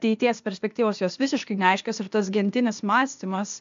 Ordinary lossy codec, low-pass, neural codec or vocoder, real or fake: MP3, 64 kbps; 7.2 kHz; codec, 16 kHz, 0.8 kbps, ZipCodec; fake